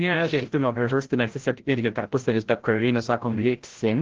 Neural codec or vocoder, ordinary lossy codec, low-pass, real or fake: codec, 16 kHz, 0.5 kbps, FreqCodec, larger model; Opus, 16 kbps; 7.2 kHz; fake